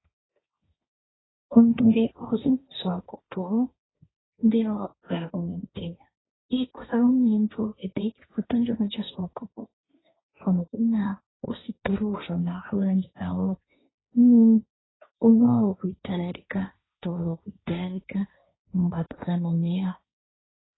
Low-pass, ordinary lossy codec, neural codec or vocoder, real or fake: 7.2 kHz; AAC, 16 kbps; codec, 16 kHz, 1.1 kbps, Voila-Tokenizer; fake